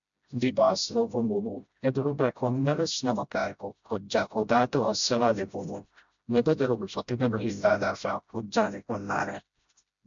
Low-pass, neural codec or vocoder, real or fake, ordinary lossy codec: 7.2 kHz; codec, 16 kHz, 0.5 kbps, FreqCodec, smaller model; fake; MP3, 64 kbps